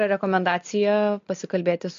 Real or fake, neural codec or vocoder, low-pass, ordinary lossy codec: real; none; 7.2 kHz; MP3, 48 kbps